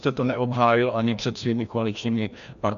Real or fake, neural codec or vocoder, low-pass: fake; codec, 16 kHz, 1 kbps, FreqCodec, larger model; 7.2 kHz